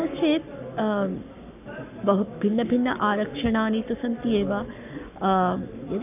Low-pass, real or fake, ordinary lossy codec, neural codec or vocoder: 3.6 kHz; fake; none; codec, 44.1 kHz, 7.8 kbps, Pupu-Codec